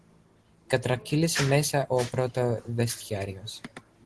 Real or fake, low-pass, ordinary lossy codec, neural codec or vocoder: real; 10.8 kHz; Opus, 16 kbps; none